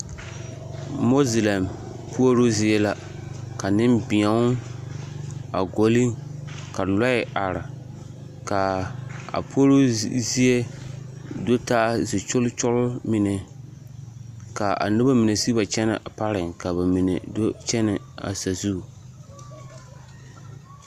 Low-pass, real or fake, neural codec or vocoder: 14.4 kHz; real; none